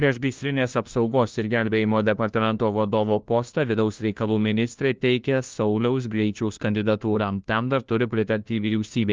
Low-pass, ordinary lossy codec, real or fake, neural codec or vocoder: 7.2 kHz; Opus, 32 kbps; fake; codec, 16 kHz, 1 kbps, FunCodec, trained on LibriTTS, 50 frames a second